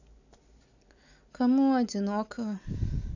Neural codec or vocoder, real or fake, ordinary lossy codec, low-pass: none; real; none; 7.2 kHz